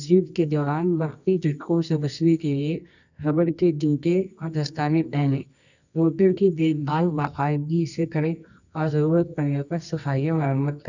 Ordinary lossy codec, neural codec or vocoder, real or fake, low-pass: none; codec, 24 kHz, 0.9 kbps, WavTokenizer, medium music audio release; fake; 7.2 kHz